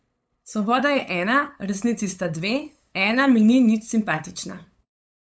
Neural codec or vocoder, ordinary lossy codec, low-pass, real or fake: codec, 16 kHz, 8 kbps, FunCodec, trained on LibriTTS, 25 frames a second; none; none; fake